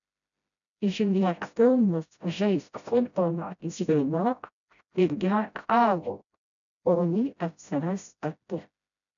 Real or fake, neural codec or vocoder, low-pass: fake; codec, 16 kHz, 0.5 kbps, FreqCodec, smaller model; 7.2 kHz